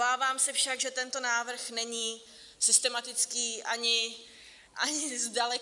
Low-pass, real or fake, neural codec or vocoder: 10.8 kHz; real; none